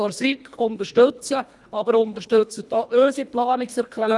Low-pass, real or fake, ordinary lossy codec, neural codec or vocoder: none; fake; none; codec, 24 kHz, 1.5 kbps, HILCodec